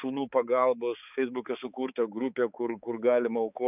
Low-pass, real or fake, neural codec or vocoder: 3.6 kHz; fake; codec, 16 kHz, 4 kbps, X-Codec, HuBERT features, trained on balanced general audio